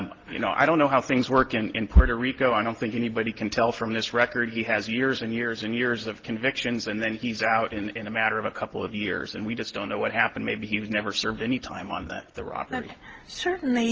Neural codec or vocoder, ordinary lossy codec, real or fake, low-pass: none; Opus, 16 kbps; real; 7.2 kHz